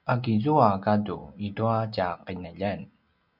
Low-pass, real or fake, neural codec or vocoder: 5.4 kHz; real; none